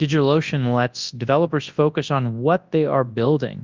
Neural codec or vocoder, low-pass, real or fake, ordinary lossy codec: codec, 24 kHz, 0.9 kbps, WavTokenizer, large speech release; 7.2 kHz; fake; Opus, 24 kbps